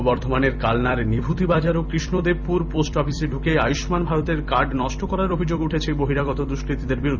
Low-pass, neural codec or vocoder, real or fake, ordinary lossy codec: 7.2 kHz; vocoder, 44.1 kHz, 128 mel bands every 256 samples, BigVGAN v2; fake; none